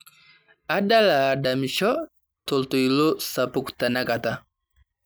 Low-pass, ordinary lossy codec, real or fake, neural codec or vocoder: none; none; real; none